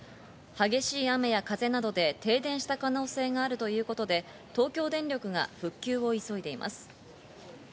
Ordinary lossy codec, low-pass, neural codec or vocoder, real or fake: none; none; none; real